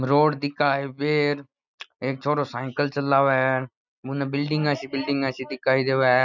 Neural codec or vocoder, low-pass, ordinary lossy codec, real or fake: none; 7.2 kHz; none; real